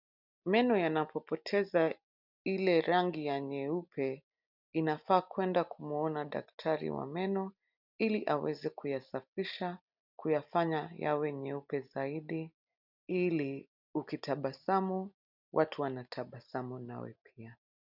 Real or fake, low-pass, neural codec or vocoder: real; 5.4 kHz; none